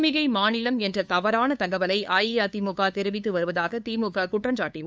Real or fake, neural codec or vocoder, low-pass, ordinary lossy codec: fake; codec, 16 kHz, 2 kbps, FunCodec, trained on LibriTTS, 25 frames a second; none; none